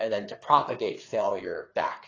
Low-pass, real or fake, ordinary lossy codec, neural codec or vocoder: 7.2 kHz; fake; AAC, 32 kbps; codec, 16 kHz, 4 kbps, FreqCodec, larger model